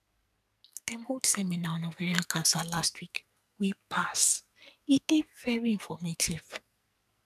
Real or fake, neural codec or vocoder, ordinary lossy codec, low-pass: fake; codec, 44.1 kHz, 2.6 kbps, SNAC; none; 14.4 kHz